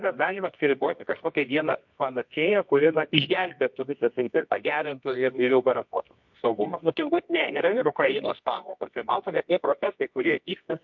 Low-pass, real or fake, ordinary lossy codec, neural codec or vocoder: 7.2 kHz; fake; MP3, 48 kbps; codec, 24 kHz, 0.9 kbps, WavTokenizer, medium music audio release